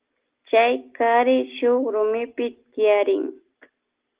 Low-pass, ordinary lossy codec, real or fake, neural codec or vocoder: 3.6 kHz; Opus, 16 kbps; real; none